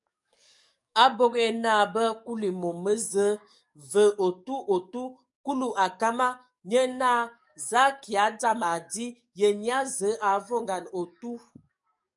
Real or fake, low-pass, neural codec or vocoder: fake; 10.8 kHz; codec, 44.1 kHz, 7.8 kbps, DAC